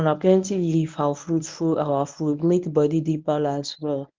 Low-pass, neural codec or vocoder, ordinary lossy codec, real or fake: 7.2 kHz; codec, 24 kHz, 0.9 kbps, WavTokenizer, medium speech release version 1; Opus, 32 kbps; fake